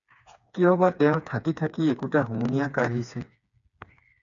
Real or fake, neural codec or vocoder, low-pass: fake; codec, 16 kHz, 4 kbps, FreqCodec, smaller model; 7.2 kHz